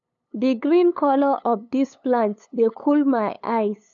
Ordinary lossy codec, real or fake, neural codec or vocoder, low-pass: none; fake; codec, 16 kHz, 8 kbps, FunCodec, trained on LibriTTS, 25 frames a second; 7.2 kHz